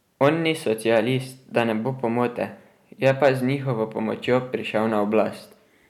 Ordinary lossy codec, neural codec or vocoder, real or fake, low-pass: none; none; real; 19.8 kHz